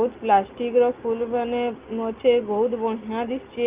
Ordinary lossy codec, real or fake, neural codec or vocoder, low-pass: Opus, 32 kbps; real; none; 3.6 kHz